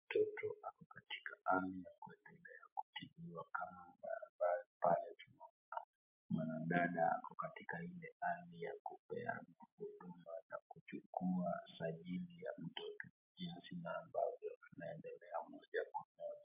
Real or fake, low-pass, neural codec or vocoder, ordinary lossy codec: real; 3.6 kHz; none; MP3, 32 kbps